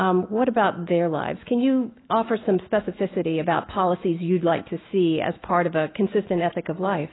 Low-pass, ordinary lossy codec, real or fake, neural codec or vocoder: 7.2 kHz; AAC, 16 kbps; fake; autoencoder, 48 kHz, 128 numbers a frame, DAC-VAE, trained on Japanese speech